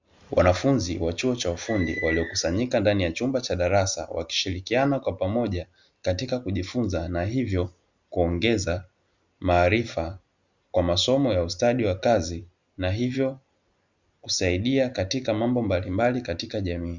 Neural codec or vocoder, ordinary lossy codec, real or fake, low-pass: none; Opus, 64 kbps; real; 7.2 kHz